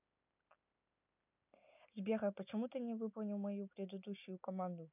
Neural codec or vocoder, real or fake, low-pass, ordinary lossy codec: none; real; 3.6 kHz; none